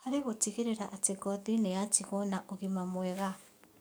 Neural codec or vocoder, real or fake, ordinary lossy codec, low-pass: codec, 44.1 kHz, 7.8 kbps, DAC; fake; none; none